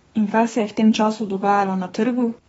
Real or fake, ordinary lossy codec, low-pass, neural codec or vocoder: fake; AAC, 24 kbps; 14.4 kHz; codec, 32 kHz, 1.9 kbps, SNAC